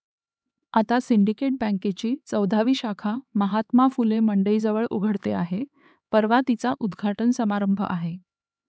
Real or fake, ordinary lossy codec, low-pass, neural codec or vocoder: fake; none; none; codec, 16 kHz, 4 kbps, X-Codec, HuBERT features, trained on LibriSpeech